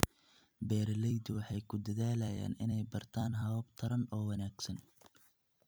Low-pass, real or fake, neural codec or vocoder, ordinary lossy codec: none; fake; vocoder, 44.1 kHz, 128 mel bands every 256 samples, BigVGAN v2; none